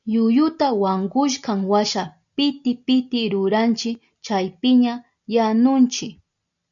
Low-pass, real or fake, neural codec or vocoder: 7.2 kHz; real; none